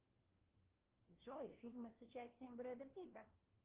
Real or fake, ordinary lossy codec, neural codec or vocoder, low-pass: fake; Opus, 16 kbps; codec, 16 kHz, 1 kbps, FunCodec, trained on LibriTTS, 50 frames a second; 3.6 kHz